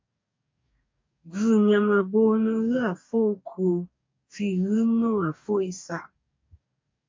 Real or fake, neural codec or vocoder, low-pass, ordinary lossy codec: fake; codec, 44.1 kHz, 2.6 kbps, DAC; 7.2 kHz; MP3, 48 kbps